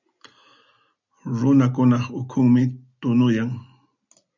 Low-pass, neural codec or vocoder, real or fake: 7.2 kHz; none; real